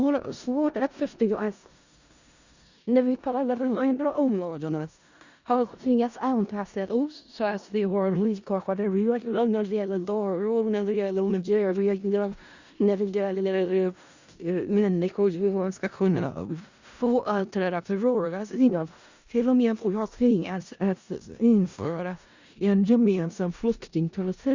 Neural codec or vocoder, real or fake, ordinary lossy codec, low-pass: codec, 16 kHz in and 24 kHz out, 0.4 kbps, LongCat-Audio-Codec, four codebook decoder; fake; Opus, 64 kbps; 7.2 kHz